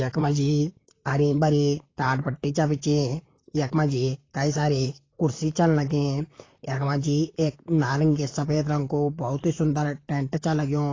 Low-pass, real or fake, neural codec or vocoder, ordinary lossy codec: 7.2 kHz; fake; vocoder, 44.1 kHz, 128 mel bands, Pupu-Vocoder; AAC, 32 kbps